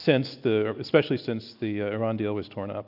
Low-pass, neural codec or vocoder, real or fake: 5.4 kHz; none; real